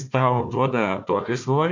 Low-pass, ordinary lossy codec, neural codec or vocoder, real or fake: 7.2 kHz; MP3, 48 kbps; codec, 16 kHz, 1 kbps, FunCodec, trained on Chinese and English, 50 frames a second; fake